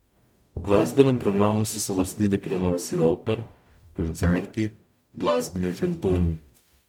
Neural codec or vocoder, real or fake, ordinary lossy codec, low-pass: codec, 44.1 kHz, 0.9 kbps, DAC; fake; MP3, 96 kbps; 19.8 kHz